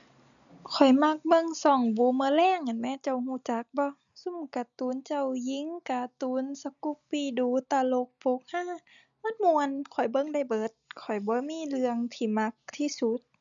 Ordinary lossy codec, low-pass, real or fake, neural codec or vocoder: none; 7.2 kHz; real; none